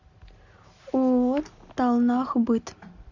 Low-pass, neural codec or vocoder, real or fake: 7.2 kHz; none; real